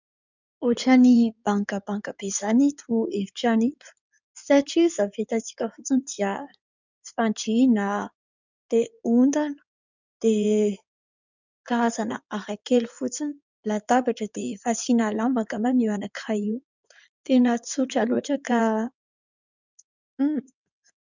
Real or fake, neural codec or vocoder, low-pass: fake; codec, 16 kHz in and 24 kHz out, 2.2 kbps, FireRedTTS-2 codec; 7.2 kHz